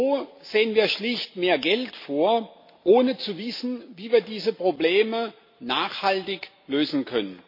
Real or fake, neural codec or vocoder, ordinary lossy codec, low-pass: real; none; MP3, 32 kbps; 5.4 kHz